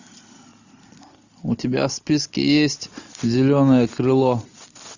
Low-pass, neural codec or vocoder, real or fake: 7.2 kHz; none; real